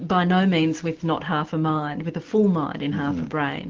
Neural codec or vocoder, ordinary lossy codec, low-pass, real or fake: none; Opus, 16 kbps; 7.2 kHz; real